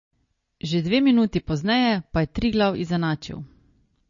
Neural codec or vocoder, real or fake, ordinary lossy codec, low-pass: none; real; MP3, 32 kbps; 7.2 kHz